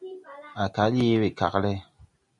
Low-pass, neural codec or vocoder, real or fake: 10.8 kHz; none; real